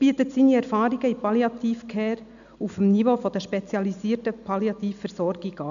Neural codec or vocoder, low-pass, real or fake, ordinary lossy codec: none; 7.2 kHz; real; none